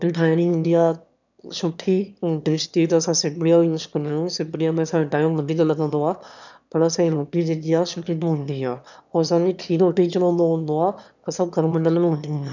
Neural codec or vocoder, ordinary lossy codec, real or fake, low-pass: autoencoder, 22.05 kHz, a latent of 192 numbers a frame, VITS, trained on one speaker; none; fake; 7.2 kHz